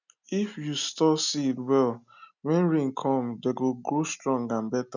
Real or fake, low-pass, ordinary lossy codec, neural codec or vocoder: real; 7.2 kHz; none; none